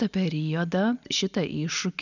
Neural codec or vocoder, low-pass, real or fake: none; 7.2 kHz; real